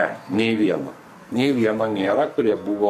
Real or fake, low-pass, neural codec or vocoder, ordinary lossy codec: fake; 14.4 kHz; codec, 44.1 kHz, 2.6 kbps, SNAC; MP3, 64 kbps